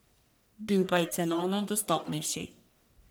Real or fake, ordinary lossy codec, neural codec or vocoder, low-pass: fake; none; codec, 44.1 kHz, 1.7 kbps, Pupu-Codec; none